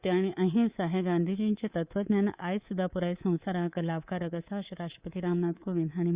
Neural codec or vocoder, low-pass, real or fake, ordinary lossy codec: codec, 24 kHz, 3.1 kbps, DualCodec; 3.6 kHz; fake; Opus, 64 kbps